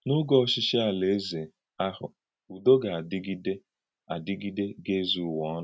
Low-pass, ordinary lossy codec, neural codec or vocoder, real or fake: none; none; none; real